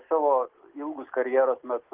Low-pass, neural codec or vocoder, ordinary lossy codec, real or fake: 3.6 kHz; none; Opus, 16 kbps; real